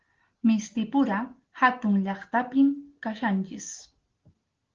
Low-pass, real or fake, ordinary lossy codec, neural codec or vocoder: 7.2 kHz; real; Opus, 16 kbps; none